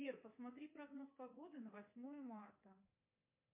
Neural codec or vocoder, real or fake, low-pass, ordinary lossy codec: codec, 24 kHz, 3.1 kbps, DualCodec; fake; 3.6 kHz; MP3, 32 kbps